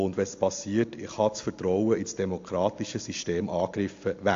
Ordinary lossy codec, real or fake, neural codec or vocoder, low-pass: AAC, 48 kbps; real; none; 7.2 kHz